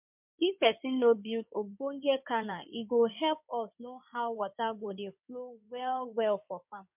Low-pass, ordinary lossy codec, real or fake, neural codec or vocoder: 3.6 kHz; MP3, 32 kbps; fake; codec, 16 kHz in and 24 kHz out, 2.2 kbps, FireRedTTS-2 codec